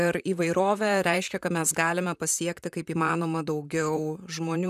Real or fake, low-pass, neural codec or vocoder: fake; 14.4 kHz; vocoder, 44.1 kHz, 128 mel bands, Pupu-Vocoder